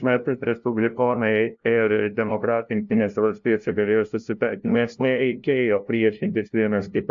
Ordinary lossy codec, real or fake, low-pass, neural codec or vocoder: AAC, 64 kbps; fake; 7.2 kHz; codec, 16 kHz, 0.5 kbps, FunCodec, trained on LibriTTS, 25 frames a second